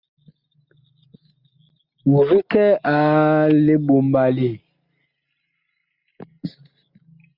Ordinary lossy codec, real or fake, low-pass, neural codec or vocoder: Opus, 64 kbps; real; 5.4 kHz; none